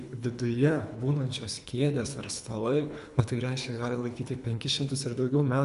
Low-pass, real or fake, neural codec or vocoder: 10.8 kHz; fake; codec, 24 kHz, 3 kbps, HILCodec